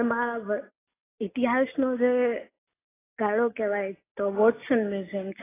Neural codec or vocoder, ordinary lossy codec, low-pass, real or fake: none; AAC, 16 kbps; 3.6 kHz; real